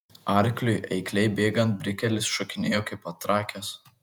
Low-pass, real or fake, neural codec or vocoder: 19.8 kHz; fake; vocoder, 48 kHz, 128 mel bands, Vocos